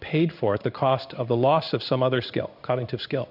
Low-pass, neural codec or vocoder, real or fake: 5.4 kHz; codec, 16 kHz in and 24 kHz out, 1 kbps, XY-Tokenizer; fake